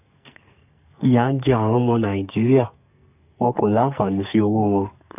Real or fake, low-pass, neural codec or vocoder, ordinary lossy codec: fake; 3.6 kHz; codec, 44.1 kHz, 2.6 kbps, SNAC; none